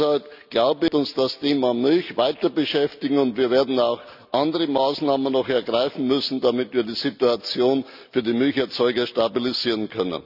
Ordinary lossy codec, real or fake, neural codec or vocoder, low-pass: none; real; none; 5.4 kHz